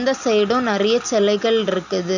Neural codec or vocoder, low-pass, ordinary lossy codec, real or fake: none; 7.2 kHz; none; real